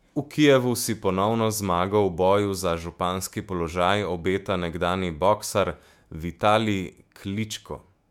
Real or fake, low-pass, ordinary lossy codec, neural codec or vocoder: fake; 19.8 kHz; MP3, 96 kbps; autoencoder, 48 kHz, 128 numbers a frame, DAC-VAE, trained on Japanese speech